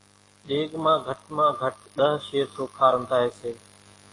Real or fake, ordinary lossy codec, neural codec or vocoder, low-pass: fake; AAC, 32 kbps; autoencoder, 48 kHz, 128 numbers a frame, DAC-VAE, trained on Japanese speech; 10.8 kHz